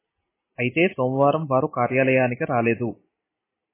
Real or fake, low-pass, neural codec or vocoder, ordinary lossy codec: real; 3.6 kHz; none; MP3, 16 kbps